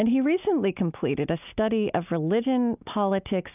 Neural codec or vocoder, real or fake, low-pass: none; real; 3.6 kHz